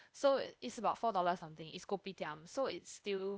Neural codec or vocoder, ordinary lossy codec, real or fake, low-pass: codec, 16 kHz, 0.8 kbps, ZipCodec; none; fake; none